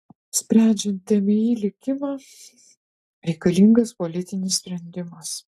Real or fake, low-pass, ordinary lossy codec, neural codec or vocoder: real; 14.4 kHz; AAC, 64 kbps; none